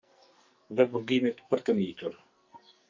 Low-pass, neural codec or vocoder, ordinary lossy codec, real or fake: 7.2 kHz; codec, 44.1 kHz, 2.6 kbps, SNAC; MP3, 64 kbps; fake